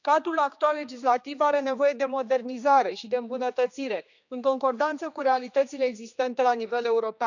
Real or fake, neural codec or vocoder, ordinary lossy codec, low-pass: fake; codec, 16 kHz, 2 kbps, X-Codec, HuBERT features, trained on general audio; none; 7.2 kHz